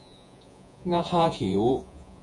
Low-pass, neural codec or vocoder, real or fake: 10.8 kHz; vocoder, 48 kHz, 128 mel bands, Vocos; fake